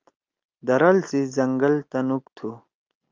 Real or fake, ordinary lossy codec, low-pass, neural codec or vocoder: real; Opus, 24 kbps; 7.2 kHz; none